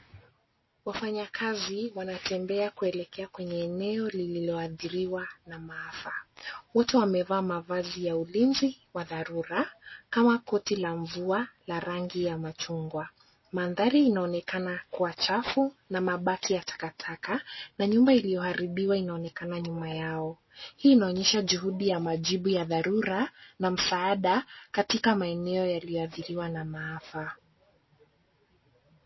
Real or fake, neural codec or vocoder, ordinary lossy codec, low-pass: real; none; MP3, 24 kbps; 7.2 kHz